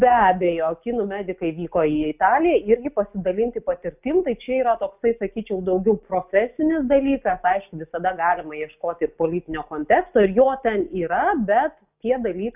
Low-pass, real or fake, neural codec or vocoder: 3.6 kHz; real; none